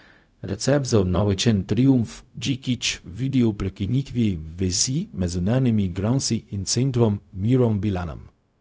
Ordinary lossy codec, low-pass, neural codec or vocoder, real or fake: none; none; codec, 16 kHz, 0.4 kbps, LongCat-Audio-Codec; fake